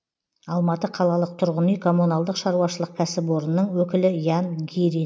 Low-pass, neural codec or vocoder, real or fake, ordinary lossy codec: none; none; real; none